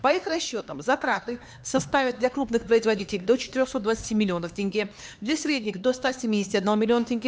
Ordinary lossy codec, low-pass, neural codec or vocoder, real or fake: none; none; codec, 16 kHz, 2 kbps, X-Codec, HuBERT features, trained on LibriSpeech; fake